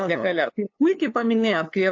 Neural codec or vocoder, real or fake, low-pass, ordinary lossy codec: codec, 16 kHz, 2 kbps, FunCodec, trained on LibriTTS, 25 frames a second; fake; 7.2 kHz; AAC, 48 kbps